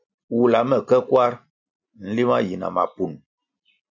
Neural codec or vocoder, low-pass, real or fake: none; 7.2 kHz; real